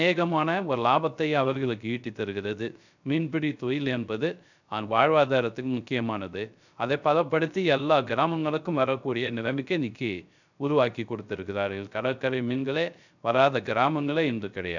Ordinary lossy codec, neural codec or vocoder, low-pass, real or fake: none; codec, 16 kHz, 0.3 kbps, FocalCodec; 7.2 kHz; fake